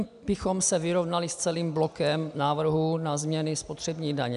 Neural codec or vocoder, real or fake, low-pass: none; real; 10.8 kHz